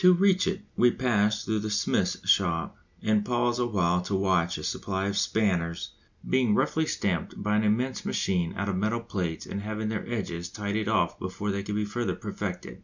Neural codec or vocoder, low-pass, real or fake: none; 7.2 kHz; real